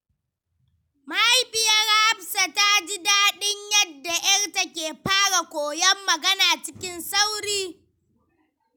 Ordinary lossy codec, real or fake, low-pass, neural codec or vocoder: none; real; none; none